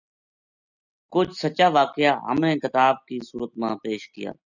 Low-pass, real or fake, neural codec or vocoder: 7.2 kHz; real; none